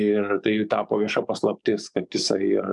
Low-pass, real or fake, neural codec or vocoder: 10.8 kHz; fake; codec, 44.1 kHz, 7.8 kbps, DAC